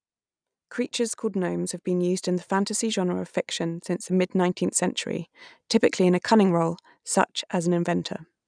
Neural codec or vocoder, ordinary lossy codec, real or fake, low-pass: none; none; real; 9.9 kHz